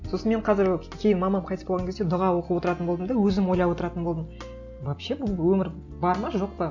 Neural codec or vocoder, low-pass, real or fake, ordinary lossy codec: none; 7.2 kHz; real; none